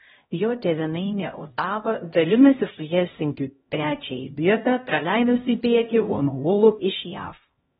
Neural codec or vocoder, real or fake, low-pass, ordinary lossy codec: codec, 16 kHz, 0.5 kbps, X-Codec, HuBERT features, trained on LibriSpeech; fake; 7.2 kHz; AAC, 16 kbps